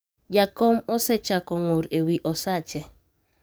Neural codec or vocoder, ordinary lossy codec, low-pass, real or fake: codec, 44.1 kHz, 7.8 kbps, DAC; none; none; fake